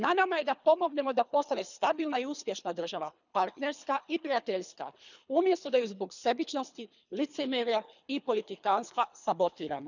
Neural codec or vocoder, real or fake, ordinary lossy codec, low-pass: codec, 24 kHz, 3 kbps, HILCodec; fake; none; 7.2 kHz